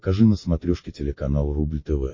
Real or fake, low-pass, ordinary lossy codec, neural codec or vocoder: real; 7.2 kHz; MP3, 32 kbps; none